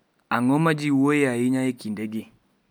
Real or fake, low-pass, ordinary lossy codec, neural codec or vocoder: real; none; none; none